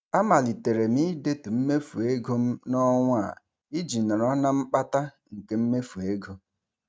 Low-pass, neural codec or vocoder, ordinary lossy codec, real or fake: none; none; none; real